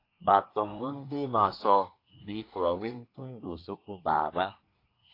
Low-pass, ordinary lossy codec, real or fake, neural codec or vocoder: 5.4 kHz; AAC, 32 kbps; fake; codec, 24 kHz, 1 kbps, SNAC